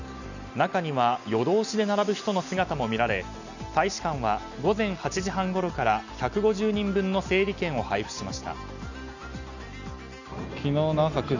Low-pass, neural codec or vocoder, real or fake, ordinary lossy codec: 7.2 kHz; none; real; none